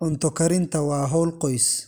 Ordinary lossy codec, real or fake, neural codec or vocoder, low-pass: none; real; none; none